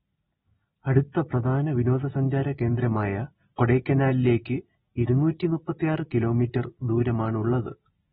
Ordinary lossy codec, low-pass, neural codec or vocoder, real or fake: AAC, 16 kbps; 19.8 kHz; none; real